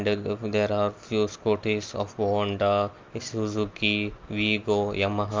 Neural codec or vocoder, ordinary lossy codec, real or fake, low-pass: none; Opus, 24 kbps; real; 7.2 kHz